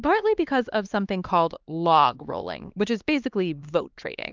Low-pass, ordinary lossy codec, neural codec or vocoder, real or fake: 7.2 kHz; Opus, 24 kbps; codec, 16 kHz, 2 kbps, X-Codec, HuBERT features, trained on LibriSpeech; fake